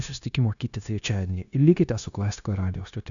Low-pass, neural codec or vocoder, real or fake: 7.2 kHz; codec, 16 kHz, 0.9 kbps, LongCat-Audio-Codec; fake